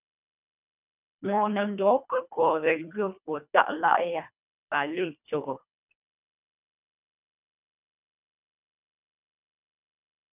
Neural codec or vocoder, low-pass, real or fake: codec, 24 kHz, 1.5 kbps, HILCodec; 3.6 kHz; fake